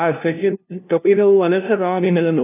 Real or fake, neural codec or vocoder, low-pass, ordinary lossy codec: fake; codec, 16 kHz, 0.5 kbps, FunCodec, trained on LibriTTS, 25 frames a second; 3.6 kHz; none